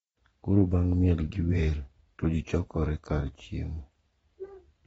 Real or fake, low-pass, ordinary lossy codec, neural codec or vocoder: fake; 19.8 kHz; AAC, 24 kbps; vocoder, 44.1 kHz, 128 mel bands every 512 samples, BigVGAN v2